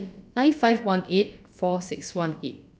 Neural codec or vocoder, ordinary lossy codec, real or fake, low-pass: codec, 16 kHz, about 1 kbps, DyCAST, with the encoder's durations; none; fake; none